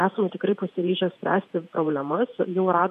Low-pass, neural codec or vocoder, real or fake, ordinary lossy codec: 14.4 kHz; vocoder, 48 kHz, 128 mel bands, Vocos; fake; MP3, 64 kbps